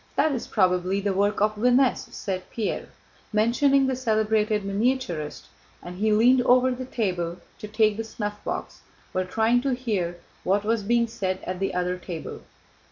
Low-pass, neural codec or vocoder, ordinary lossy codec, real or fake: 7.2 kHz; none; MP3, 64 kbps; real